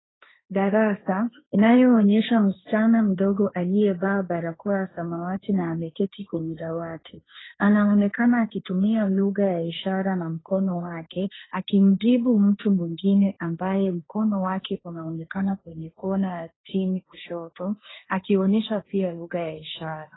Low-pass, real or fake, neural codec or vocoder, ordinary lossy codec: 7.2 kHz; fake; codec, 16 kHz, 1.1 kbps, Voila-Tokenizer; AAC, 16 kbps